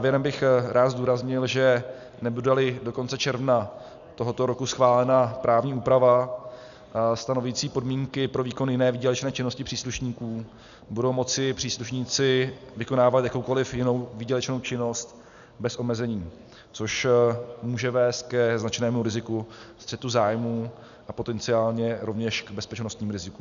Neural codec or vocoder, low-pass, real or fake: none; 7.2 kHz; real